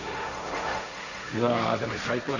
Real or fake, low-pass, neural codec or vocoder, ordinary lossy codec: fake; 7.2 kHz; codec, 16 kHz, 1.1 kbps, Voila-Tokenizer; none